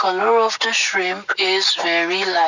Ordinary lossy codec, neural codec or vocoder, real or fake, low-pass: none; none; real; 7.2 kHz